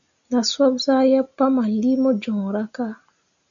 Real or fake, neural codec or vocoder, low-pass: real; none; 7.2 kHz